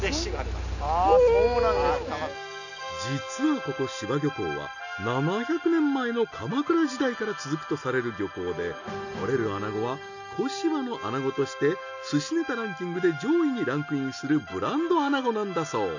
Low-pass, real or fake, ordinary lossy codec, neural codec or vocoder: 7.2 kHz; real; none; none